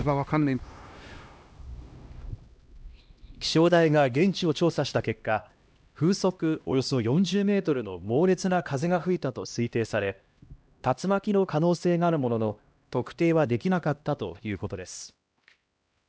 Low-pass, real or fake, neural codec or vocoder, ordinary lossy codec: none; fake; codec, 16 kHz, 1 kbps, X-Codec, HuBERT features, trained on LibriSpeech; none